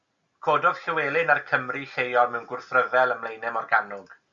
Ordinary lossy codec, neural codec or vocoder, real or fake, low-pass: Opus, 64 kbps; none; real; 7.2 kHz